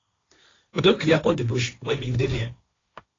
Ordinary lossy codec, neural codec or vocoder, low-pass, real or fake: AAC, 32 kbps; codec, 16 kHz, 1.1 kbps, Voila-Tokenizer; 7.2 kHz; fake